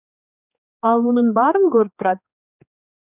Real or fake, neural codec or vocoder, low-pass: fake; codec, 16 kHz, 2 kbps, X-Codec, HuBERT features, trained on general audio; 3.6 kHz